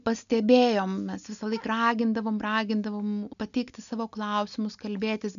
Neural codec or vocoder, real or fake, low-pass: none; real; 7.2 kHz